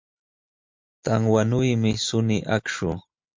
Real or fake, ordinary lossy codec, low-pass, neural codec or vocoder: real; MP3, 64 kbps; 7.2 kHz; none